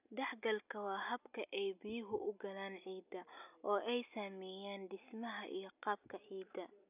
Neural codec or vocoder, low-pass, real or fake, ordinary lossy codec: none; 3.6 kHz; real; none